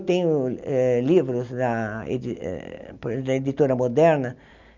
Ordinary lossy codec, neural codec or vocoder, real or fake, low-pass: none; none; real; 7.2 kHz